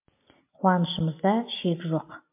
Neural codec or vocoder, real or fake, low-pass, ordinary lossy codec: none; real; 3.6 kHz; MP3, 32 kbps